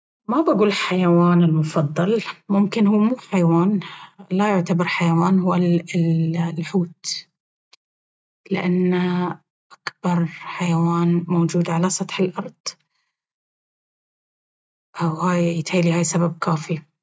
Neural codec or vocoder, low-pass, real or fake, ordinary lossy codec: none; none; real; none